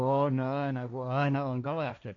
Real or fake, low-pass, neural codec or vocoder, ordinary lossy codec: fake; 7.2 kHz; codec, 16 kHz, 1.1 kbps, Voila-Tokenizer; none